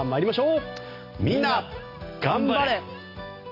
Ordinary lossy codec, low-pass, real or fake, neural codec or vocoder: AAC, 32 kbps; 5.4 kHz; real; none